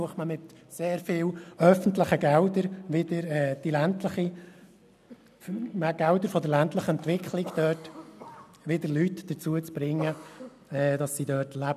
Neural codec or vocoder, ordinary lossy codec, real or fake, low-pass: none; none; real; 14.4 kHz